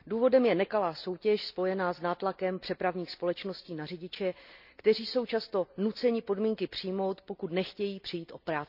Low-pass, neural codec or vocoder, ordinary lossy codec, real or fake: 5.4 kHz; none; none; real